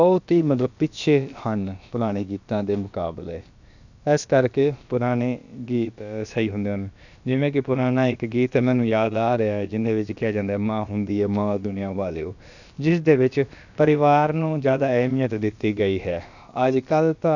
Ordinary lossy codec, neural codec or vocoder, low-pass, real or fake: none; codec, 16 kHz, about 1 kbps, DyCAST, with the encoder's durations; 7.2 kHz; fake